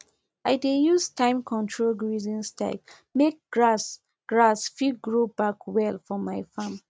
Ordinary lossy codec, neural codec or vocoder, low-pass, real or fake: none; none; none; real